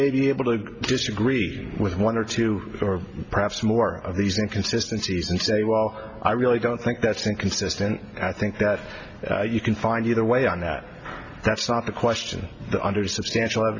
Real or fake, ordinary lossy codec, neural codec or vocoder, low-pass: real; Opus, 64 kbps; none; 7.2 kHz